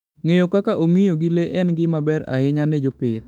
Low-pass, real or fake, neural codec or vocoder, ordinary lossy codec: 19.8 kHz; fake; autoencoder, 48 kHz, 32 numbers a frame, DAC-VAE, trained on Japanese speech; none